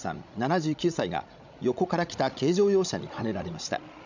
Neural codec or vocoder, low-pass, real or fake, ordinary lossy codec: codec, 16 kHz, 16 kbps, FreqCodec, larger model; 7.2 kHz; fake; none